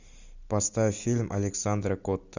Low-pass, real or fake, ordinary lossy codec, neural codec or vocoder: 7.2 kHz; real; Opus, 64 kbps; none